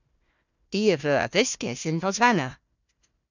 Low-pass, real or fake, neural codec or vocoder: 7.2 kHz; fake; codec, 16 kHz, 1 kbps, FunCodec, trained on Chinese and English, 50 frames a second